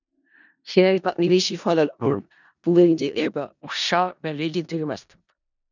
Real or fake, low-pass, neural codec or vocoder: fake; 7.2 kHz; codec, 16 kHz in and 24 kHz out, 0.4 kbps, LongCat-Audio-Codec, four codebook decoder